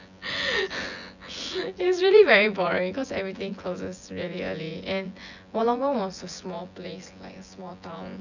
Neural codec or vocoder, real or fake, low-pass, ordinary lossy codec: vocoder, 24 kHz, 100 mel bands, Vocos; fake; 7.2 kHz; none